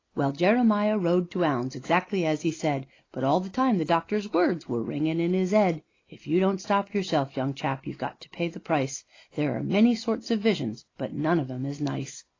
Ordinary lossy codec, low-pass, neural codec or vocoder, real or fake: AAC, 32 kbps; 7.2 kHz; none; real